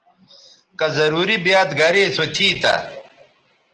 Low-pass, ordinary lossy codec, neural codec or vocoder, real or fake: 9.9 kHz; Opus, 16 kbps; none; real